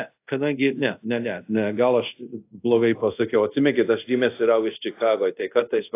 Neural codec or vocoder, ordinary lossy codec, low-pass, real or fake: codec, 24 kHz, 0.5 kbps, DualCodec; AAC, 24 kbps; 3.6 kHz; fake